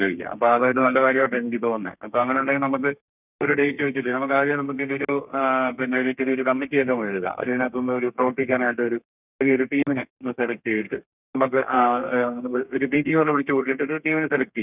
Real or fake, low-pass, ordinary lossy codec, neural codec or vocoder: fake; 3.6 kHz; none; codec, 32 kHz, 1.9 kbps, SNAC